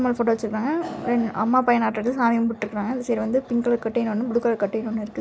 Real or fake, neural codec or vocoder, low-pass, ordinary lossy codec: real; none; none; none